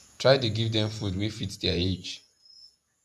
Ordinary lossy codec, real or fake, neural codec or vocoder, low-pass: none; fake; vocoder, 48 kHz, 128 mel bands, Vocos; 14.4 kHz